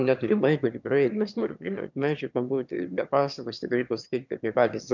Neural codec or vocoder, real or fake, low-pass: autoencoder, 22.05 kHz, a latent of 192 numbers a frame, VITS, trained on one speaker; fake; 7.2 kHz